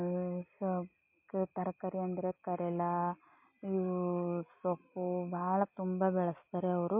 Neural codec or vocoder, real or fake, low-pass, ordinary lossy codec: none; real; 3.6 kHz; MP3, 24 kbps